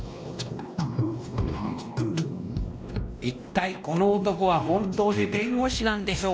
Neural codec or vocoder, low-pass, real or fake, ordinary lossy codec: codec, 16 kHz, 1 kbps, X-Codec, WavLM features, trained on Multilingual LibriSpeech; none; fake; none